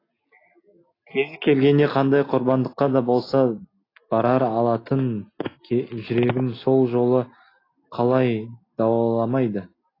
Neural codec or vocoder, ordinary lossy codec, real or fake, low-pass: none; AAC, 24 kbps; real; 5.4 kHz